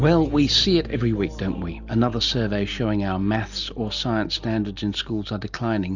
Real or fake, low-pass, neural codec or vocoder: real; 7.2 kHz; none